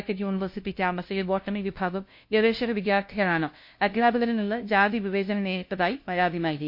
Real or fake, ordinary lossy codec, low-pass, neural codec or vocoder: fake; MP3, 32 kbps; 5.4 kHz; codec, 16 kHz, 0.5 kbps, FunCodec, trained on LibriTTS, 25 frames a second